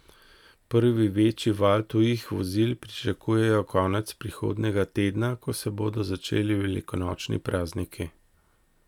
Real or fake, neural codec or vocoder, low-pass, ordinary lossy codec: real; none; 19.8 kHz; none